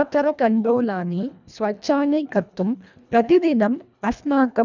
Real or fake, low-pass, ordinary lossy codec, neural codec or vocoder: fake; 7.2 kHz; none; codec, 24 kHz, 1.5 kbps, HILCodec